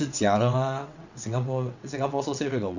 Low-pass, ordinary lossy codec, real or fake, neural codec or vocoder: 7.2 kHz; none; fake; vocoder, 22.05 kHz, 80 mel bands, WaveNeXt